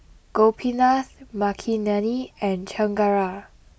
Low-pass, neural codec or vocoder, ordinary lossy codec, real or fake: none; none; none; real